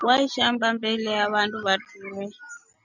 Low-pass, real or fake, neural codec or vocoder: 7.2 kHz; real; none